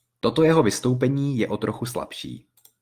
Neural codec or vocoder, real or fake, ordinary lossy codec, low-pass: none; real; Opus, 24 kbps; 14.4 kHz